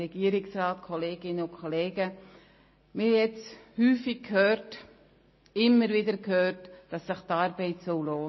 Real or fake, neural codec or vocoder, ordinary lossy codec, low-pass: real; none; MP3, 24 kbps; 7.2 kHz